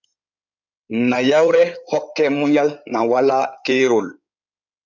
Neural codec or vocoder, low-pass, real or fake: codec, 16 kHz in and 24 kHz out, 2.2 kbps, FireRedTTS-2 codec; 7.2 kHz; fake